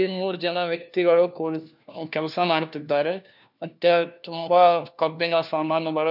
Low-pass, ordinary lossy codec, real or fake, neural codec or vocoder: 5.4 kHz; none; fake; codec, 16 kHz, 1 kbps, FunCodec, trained on LibriTTS, 50 frames a second